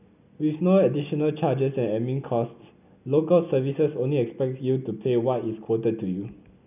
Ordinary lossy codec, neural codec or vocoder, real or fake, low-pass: none; none; real; 3.6 kHz